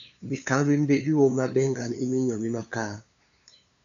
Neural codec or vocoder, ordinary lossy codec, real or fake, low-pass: codec, 16 kHz, 2 kbps, FunCodec, trained on LibriTTS, 25 frames a second; MP3, 96 kbps; fake; 7.2 kHz